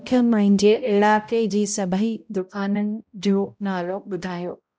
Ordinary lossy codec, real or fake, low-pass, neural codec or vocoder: none; fake; none; codec, 16 kHz, 0.5 kbps, X-Codec, HuBERT features, trained on balanced general audio